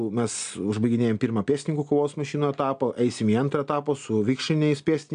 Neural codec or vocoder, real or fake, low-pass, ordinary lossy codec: none; real; 9.9 kHz; MP3, 96 kbps